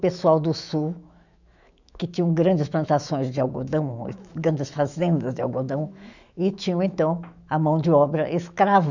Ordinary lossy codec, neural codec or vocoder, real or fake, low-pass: none; autoencoder, 48 kHz, 128 numbers a frame, DAC-VAE, trained on Japanese speech; fake; 7.2 kHz